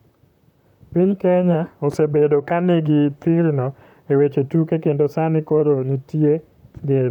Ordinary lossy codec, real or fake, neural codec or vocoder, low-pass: none; fake; vocoder, 44.1 kHz, 128 mel bands, Pupu-Vocoder; 19.8 kHz